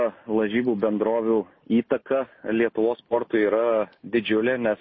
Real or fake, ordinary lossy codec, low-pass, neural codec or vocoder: real; MP3, 24 kbps; 7.2 kHz; none